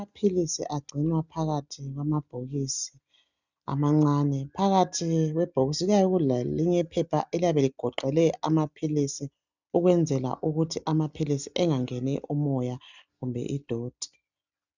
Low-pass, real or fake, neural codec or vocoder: 7.2 kHz; real; none